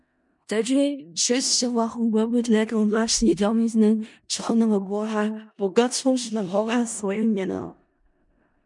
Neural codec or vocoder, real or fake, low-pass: codec, 16 kHz in and 24 kHz out, 0.4 kbps, LongCat-Audio-Codec, four codebook decoder; fake; 10.8 kHz